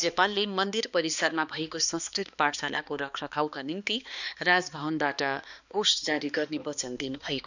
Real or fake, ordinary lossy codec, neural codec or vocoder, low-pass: fake; none; codec, 16 kHz, 2 kbps, X-Codec, HuBERT features, trained on balanced general audio; 7.2 kHz